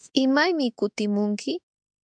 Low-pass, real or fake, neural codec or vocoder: 9.9 kHz; fake; autoencoder, 48 kHz, 32 numbers a frame, DAC-VAE, trained on Japanese speech